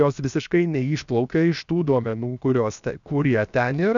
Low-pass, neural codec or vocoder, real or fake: 7.2 kHz; codec, 16 kHz, 0.7 kbps, FocalCodec; fake